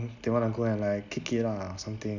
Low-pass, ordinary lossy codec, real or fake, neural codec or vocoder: 7.2 kHz; Opus, 64 kbps; real; none